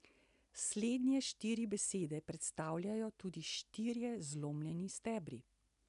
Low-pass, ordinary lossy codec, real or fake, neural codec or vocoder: 10.8 kHz; none; real; none